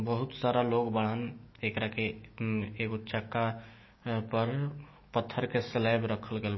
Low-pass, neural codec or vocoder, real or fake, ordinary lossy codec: 7.2 kHz; autoencoder, 48 kHz, 128 numbers a frame, DAC-VAE, trained on Japanese speech; fake; MP3, 24 kbps